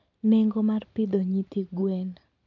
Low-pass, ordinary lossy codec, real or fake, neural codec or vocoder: 7.2 kHz; none; real; none